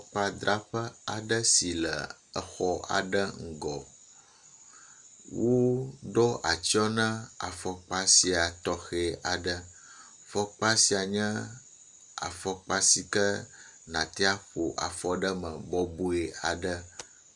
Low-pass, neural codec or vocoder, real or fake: 10.8 kHz; none; real